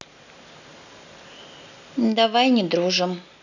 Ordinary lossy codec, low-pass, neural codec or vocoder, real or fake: none; 7.2 kHz; none; real